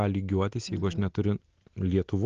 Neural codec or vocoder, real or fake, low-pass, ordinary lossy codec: none; real; 7.2 kHz; Opus, 16 kbps